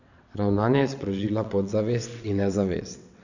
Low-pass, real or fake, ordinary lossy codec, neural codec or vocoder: 7.2 kHz; fake; none; vocoder, 22.05 kHz, 80 mel bands, WaveNeXt